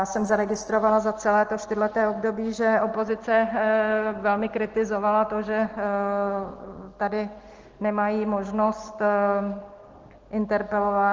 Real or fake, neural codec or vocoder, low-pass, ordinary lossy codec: real; none; 7.2 kHz; Opus, 16 kbps